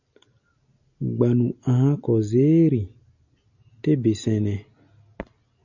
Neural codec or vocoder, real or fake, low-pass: none; real; 7.2 kHz